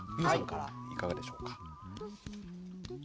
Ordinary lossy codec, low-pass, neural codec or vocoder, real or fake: none; none; none; real